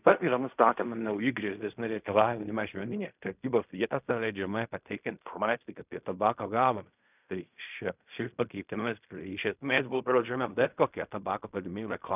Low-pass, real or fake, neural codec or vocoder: 3.6 kHz; fake; codec, 16 kHz in and 24 kHz out, 0.4 kbps, LongCat-Audio-Codec, fine tuned four codebook decoder